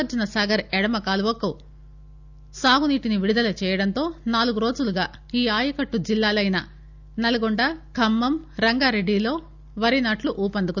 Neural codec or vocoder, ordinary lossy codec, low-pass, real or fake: none; none; 7.2 kHz; real